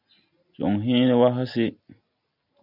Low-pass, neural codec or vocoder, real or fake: 5.4 kHz; none; real